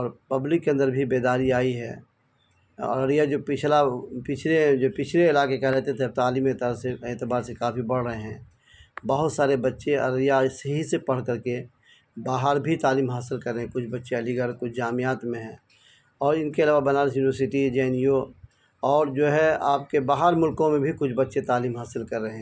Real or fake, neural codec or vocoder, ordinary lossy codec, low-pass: real; none; none; none